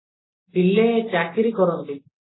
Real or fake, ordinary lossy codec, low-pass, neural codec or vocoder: real; AAC, 16 kbps; 7.2 kHz; none